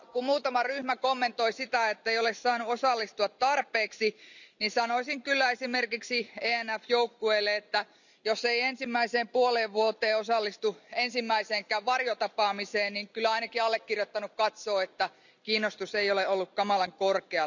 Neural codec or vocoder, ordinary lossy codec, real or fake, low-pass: none; none; real; 7.2 kHz